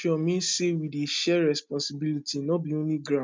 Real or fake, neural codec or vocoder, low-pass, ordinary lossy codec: real; none; none; none